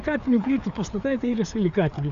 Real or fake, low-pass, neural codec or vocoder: fake; 7.2 kHz; codec, 16 kHz, 4 kbps, FreqCodec, larger model